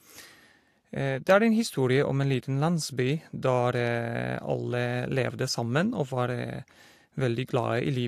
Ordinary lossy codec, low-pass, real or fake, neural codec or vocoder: AAC, 64 kbps; 14.4 kHz; real; none